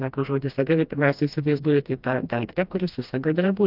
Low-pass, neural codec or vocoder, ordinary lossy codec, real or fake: 5.4 kHz; codec, 16 kHz, 1 kbps, FreqCodec, smaller model; Opus, 24 kbps; fake